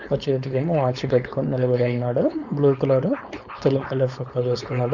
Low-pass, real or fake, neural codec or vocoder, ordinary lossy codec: 7.2 kHz; fake; codec, 16 kHz, 4.8 kbps, FACodec; none